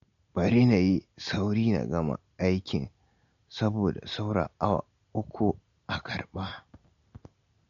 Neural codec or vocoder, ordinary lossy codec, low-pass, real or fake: none; MP3, 48 kbps; 7.2 kHz; real